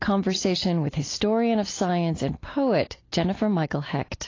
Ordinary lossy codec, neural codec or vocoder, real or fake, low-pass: AAC, 32 kbps; none; real; 7.2 kHz